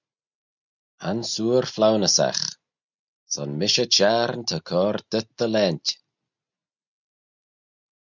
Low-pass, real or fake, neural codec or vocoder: 7.2 kHz; real; none